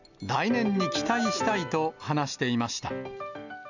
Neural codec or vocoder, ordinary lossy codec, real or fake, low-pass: none; none; real; 7.2 kHz